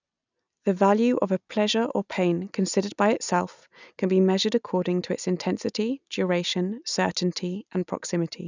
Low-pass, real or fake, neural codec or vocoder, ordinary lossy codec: 7.2 kHz; real; none; none